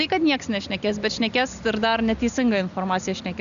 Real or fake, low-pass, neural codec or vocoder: real; 7.2 kHz; none